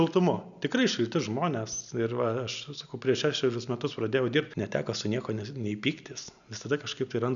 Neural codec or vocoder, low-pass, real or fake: none; 7.2 kHz; real